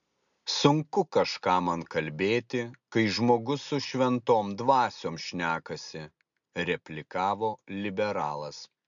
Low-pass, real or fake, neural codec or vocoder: 7.2 kHz; real; none